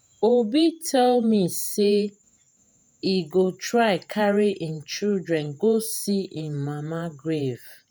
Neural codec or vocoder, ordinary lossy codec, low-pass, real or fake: vocoder, 48 kHz, 128 mel bands, Vocos; none; none; fake